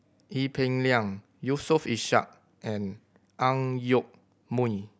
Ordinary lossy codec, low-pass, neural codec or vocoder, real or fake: none; none; none; real